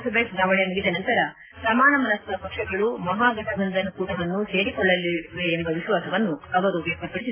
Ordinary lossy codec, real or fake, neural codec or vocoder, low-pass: AAC, 16 kbps; real; none; 3.6 kHz